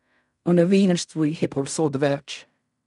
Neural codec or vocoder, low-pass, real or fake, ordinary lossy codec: codec, 16 kHz in and 24 kHz out, 0.4 kbps, LongCat-Audio-Codec, fine tuned four codebook decoder; 10.8 kHz; fake; none